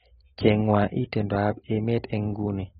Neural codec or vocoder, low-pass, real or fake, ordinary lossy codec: none; 19.8 kHz; real; AAC, 16 kbps